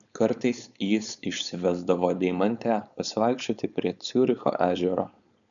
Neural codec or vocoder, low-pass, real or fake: codec, 16 kHz, 4.8 kbps, FACodec; 7.2 kHz; fake